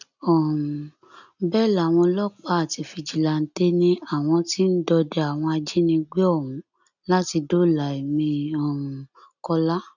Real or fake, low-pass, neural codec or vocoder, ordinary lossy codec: real; 7.2 kHz; none; none